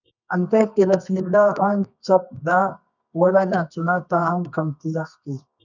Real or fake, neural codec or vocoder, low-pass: fake; codec, 24 kHz, 0.9 kbps, WavTokenizer, medium music audio release; 7.2 kHz